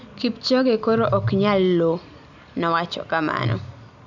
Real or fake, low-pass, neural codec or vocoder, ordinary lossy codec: real; 7.2 kHz; none; none